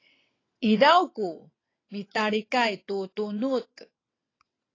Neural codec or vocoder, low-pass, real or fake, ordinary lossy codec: vocoder, 22.05 kHz, 80 mel bands, WaveNeXt; 7.2 kHz; fake; AAC, 32 kbps